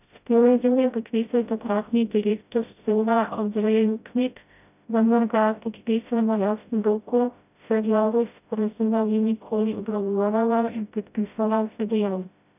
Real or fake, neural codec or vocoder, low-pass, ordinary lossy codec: fake; codec, 16 kHz, 0.5 kbps, FreqCodec, smaller model; 3.6 kHz; none